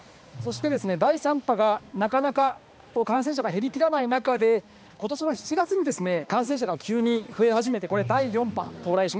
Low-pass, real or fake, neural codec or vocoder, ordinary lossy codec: none; fake; codec, 16 kHz, 2 kbps, X-Codec, HuBERT features, trained on balanced general audio; none